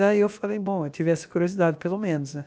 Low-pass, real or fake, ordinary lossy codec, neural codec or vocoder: none; fake; none; codec, 16 kHz, about 1 kbps, DyCAST, with the encoder's durations